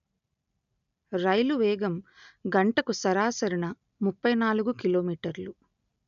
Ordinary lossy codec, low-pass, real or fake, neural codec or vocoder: none; 7.2 kHz; real; none